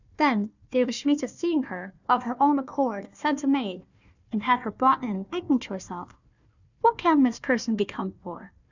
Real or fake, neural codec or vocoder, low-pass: fake; codec, 16 kHz, 1 kbps, FunCodec, trained on Chinese and English, 50 frames a second; 7.2 kHz